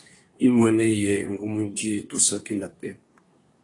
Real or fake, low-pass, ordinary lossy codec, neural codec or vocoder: fake; 10.8 kHz; AAC, 32 kbps; codec, 24 kHz, 1 kbps, SNAC